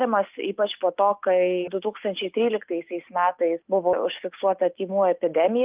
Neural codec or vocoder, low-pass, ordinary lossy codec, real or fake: none; 3.6 kHz; Opus, 24 kbps; real